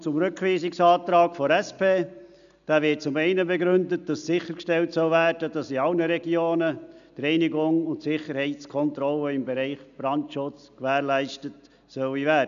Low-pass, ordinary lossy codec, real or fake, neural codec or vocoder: 7.2 kHz; none; real; none